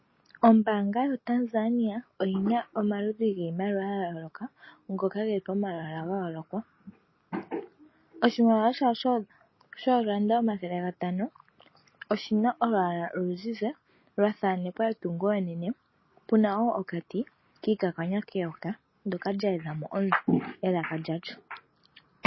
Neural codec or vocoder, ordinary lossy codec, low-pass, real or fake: none; MP3, 24 kbps; 7.2 kHz; real